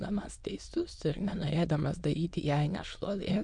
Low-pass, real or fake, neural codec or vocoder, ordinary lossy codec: 9.9 kHz; fake; autoencoder, 22.05 kHz, a latent of 192 numbers a frame, VITS, trained on many speakers; MP3, 64 kbps